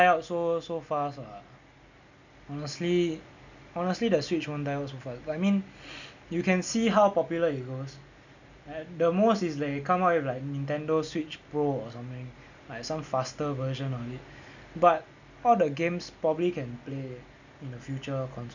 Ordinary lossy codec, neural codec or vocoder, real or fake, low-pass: none; none; real; 7.2 kHz